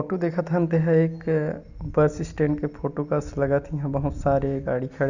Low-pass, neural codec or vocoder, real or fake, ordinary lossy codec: 7.2 kHz; none; real; Opus, 64 kbps